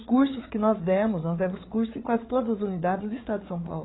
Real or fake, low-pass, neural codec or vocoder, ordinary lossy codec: fake; 7.2 kHz; codec, 16 kHz, 8 kbps, FreqCodec, larger model; AAC, 16 kbps